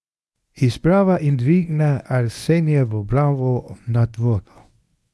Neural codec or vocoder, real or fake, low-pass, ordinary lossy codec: codec, 24 kHz, 0.9 kbps, WavTokenizer, medium speech release version 1; fake; none; none